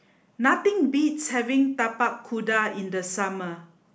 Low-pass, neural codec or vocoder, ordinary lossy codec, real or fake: none; none; none; real